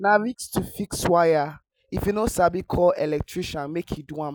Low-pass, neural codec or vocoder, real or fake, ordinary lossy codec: none; none; real; none